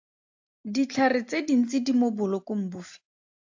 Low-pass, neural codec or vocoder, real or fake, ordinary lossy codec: 7.2 kHz; none; real; AAC, 48 kbps